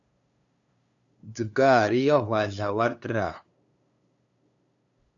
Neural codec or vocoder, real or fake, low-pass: codec, 16 kHz, 2 kbps, FunCodec, trained on LibriTTS, 25 frames a second; fake; 7.2 kHz